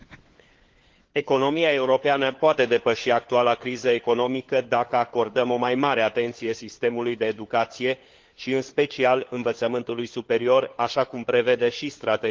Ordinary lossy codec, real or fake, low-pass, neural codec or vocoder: Opus, 16 kbps; fake; 7.2 kHz; codec, 16 kHz, 4 kbps, FunCodec, trained on LibriTTS, 50 frames a second